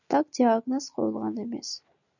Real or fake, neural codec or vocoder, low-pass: real; none; 7.2 kHz